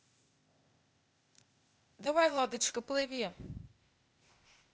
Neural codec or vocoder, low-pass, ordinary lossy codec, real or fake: codec, 16 kHz, 0.8 kbps, ZipCodec; none; none; fake